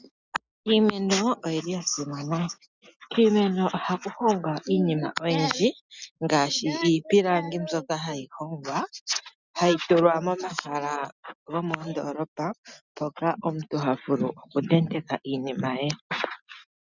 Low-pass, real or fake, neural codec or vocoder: 7.2 kHz; real; none